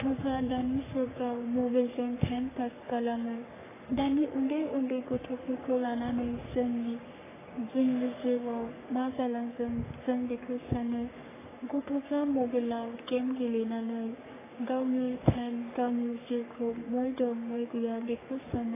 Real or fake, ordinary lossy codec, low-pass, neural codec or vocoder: fake; AAC, 16 kbps; 3.6 kHz; codec, 44.1 kHz, 3.4 kbps, Pupu-Codec